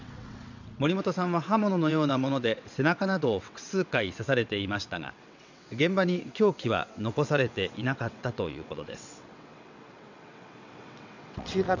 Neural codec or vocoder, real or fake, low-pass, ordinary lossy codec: vocoder, 22.05 kHz, 80 mel bands, WaveNeXt; fake; 7.2 kHz; none